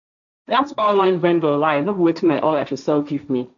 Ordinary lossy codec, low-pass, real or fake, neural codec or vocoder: none; 7.2 kHz; fake; codec, 16 kHz, 1.1 kbps, Voila-Tokenizer